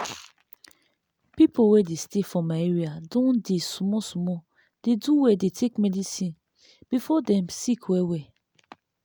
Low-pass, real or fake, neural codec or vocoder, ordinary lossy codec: none; real; none; none